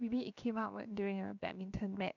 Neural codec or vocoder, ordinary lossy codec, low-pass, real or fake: codec, 16 kHz, 0.7 kbps, FocalCodec; none; 7.2 kHz; fake